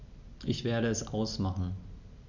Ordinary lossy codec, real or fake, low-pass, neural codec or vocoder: none; real; 7.2 kHz; none